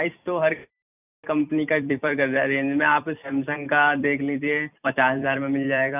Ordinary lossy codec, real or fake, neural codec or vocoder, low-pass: none; fake; vocoder, 44.1 kHz, 128 mel bands every 256 samples, BigVGAN v2; 3.6 kHz